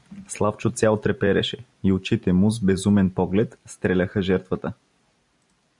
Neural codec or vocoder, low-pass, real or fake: none; 10.8 kHz; real